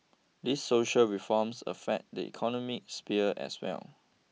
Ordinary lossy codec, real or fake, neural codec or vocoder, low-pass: none; real; none; none